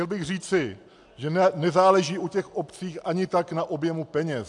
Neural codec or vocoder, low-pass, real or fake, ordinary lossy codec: none; 10.8 kHz; real; AAC, 64 kbps